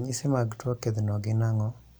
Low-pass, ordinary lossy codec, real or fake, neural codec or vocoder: none; none; real; none